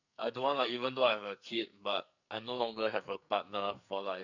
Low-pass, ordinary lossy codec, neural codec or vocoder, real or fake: 7.2 kHz; none; codec, 44.1 kHz, 2.6 kbps, SNAC; fake